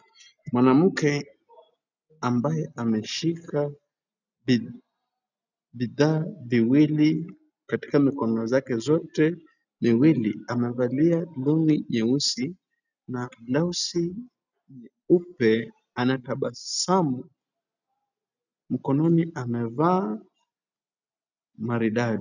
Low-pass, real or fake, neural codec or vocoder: 7.2 kHz; real; none